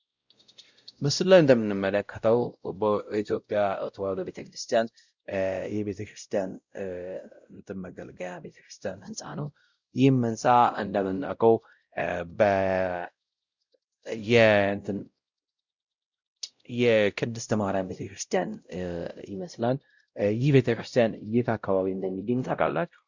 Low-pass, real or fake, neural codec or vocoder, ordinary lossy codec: 7.2 kHz; fake; codec, 16 kHz, 0.5 kbps, X-Codec, WavLM features, trained on Multilingual LibriSpeech; Opus, 64 kbps